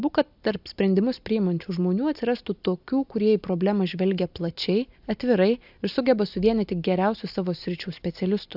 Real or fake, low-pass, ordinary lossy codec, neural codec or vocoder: real; 5.4 kHz; AAC, 48 kbps; none